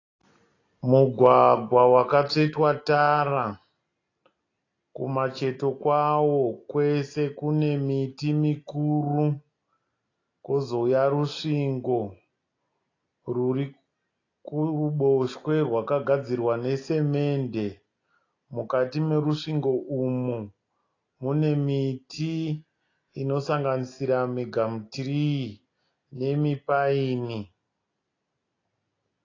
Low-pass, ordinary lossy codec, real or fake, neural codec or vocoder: 7.2 kHz; AAC, 32 kbps; real; none